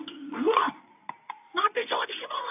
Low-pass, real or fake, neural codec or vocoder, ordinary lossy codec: 3.6 kHz; fake; codec, 24 kHz, 0.9 kbps, WavTokenizer, medium speech release version 2; AAC, 32 kbps